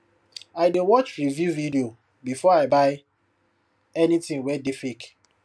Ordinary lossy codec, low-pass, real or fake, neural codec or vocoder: none; none; real; none